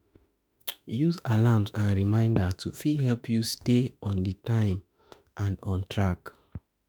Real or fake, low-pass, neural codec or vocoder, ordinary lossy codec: fake; none; autoencoder, 48 kHz, 32 numbers a frame, DAC-VAE, trained on Japanese speech; none